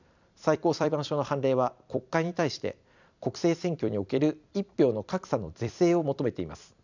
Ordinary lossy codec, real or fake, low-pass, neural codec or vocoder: none; fake; 7.2 kHz; vocoder, 44.1 kHz, 128 mel bands every 256 samples, BigVGAN v2